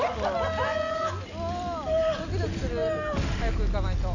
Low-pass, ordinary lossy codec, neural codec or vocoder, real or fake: 7.2 kHz; none; none; real